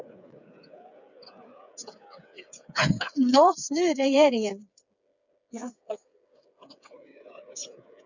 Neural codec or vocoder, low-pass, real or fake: codec, 16 kHz, 8 kbps, FreqCodec, smaller model; 7.2 kHz; fake